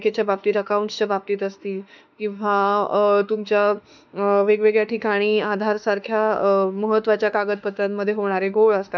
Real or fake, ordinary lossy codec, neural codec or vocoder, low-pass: fake; none; autoencoder, 48 kHz, 32 numbers a frame, DAC-VAE, trained on Japanese speech; 7.2 kHz